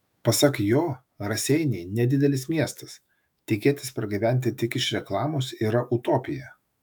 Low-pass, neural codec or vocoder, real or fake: 19.8 kHz; autoencoder, 48 kHz, 128 numbers a frame, DAC-VAE, trained on Japanese speech; fake